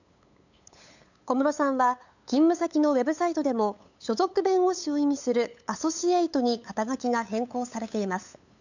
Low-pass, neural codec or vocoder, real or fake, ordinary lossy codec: 7.2 kHz; codec, 16 kHz, 8 kbps, FunCodec, trained on LibriTTS, 25 frames a second; fake; none